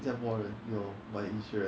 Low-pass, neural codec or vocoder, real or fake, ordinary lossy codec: none; none; real; none